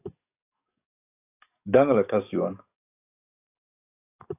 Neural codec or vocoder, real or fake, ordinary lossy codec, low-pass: codec, 44.1 kHz, 7.8 kbps, DAC; fake; AAC, 32 kbps; 3.6 kHz